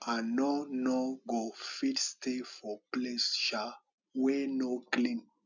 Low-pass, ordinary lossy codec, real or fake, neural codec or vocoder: 7.2 kHz; none; real; none